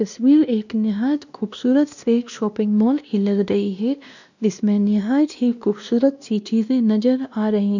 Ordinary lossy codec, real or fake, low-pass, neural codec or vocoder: none; fake; 7.2 kHz; codec, 16 kHz, 1 kbps, X-Codec, WavLM features, trained on Multilingual LibriSpeech